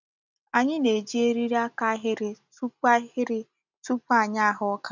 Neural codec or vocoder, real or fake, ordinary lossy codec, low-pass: none; real; none; 7.2 kHz